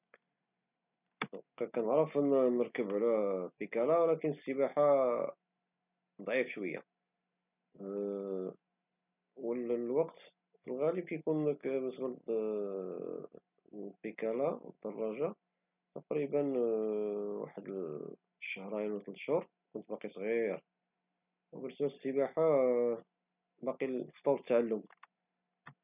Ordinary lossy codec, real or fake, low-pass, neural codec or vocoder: none; real; 3.6 kHz; none